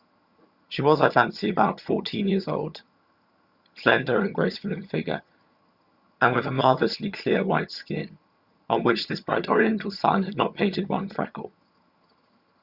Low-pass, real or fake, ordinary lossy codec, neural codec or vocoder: 5.4 kHz; fake; Opus, 64 kbps; vocoder, 22.05 kHz, 80 mel bands, HiFi-GAN